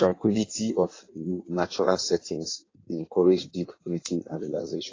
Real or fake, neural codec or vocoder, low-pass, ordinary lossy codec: fake; codec, 16 kHz in and 24 kHz out, 1.1 kbps, FireRedTTS-2 codec; 7.2 kHz; AAC, 32 kbps